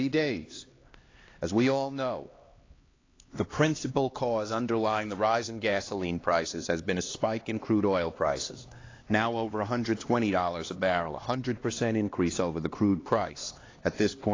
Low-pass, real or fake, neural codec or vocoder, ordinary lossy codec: 7.2 kHz; fake; codec, 16 kHz, 2 kbps, X-Codec, HuBERT features, trained on LibriSpeech; AAC, 32 kbps